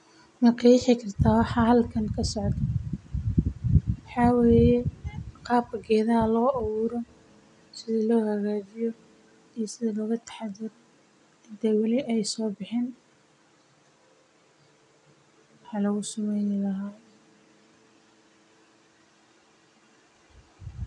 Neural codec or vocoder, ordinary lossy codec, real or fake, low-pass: none; none; real; 10.8 kHz